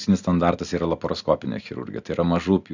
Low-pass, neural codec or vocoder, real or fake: 7.2 kHz; none; real